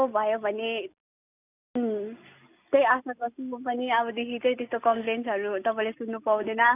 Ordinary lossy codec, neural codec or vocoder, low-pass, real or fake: none; none; 3.6 kHz; real